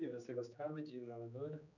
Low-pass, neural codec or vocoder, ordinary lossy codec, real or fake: 7.2 kHz; codec, 16 kHz, 2 kbps, X-Codec, HuBERT features, trained on general audio; none; fake